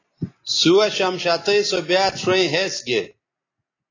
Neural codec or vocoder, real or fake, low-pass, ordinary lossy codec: none; real; 7.2 kHz; AAC, 32 kbps